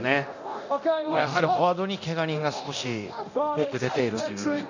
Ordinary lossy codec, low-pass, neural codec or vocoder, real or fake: none; 7.2 kHz; codec, 24 kHz, 0.9 kbps, DualCodec; fake